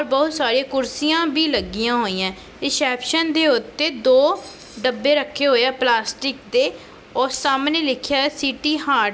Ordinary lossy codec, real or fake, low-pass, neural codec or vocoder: none; real; none; none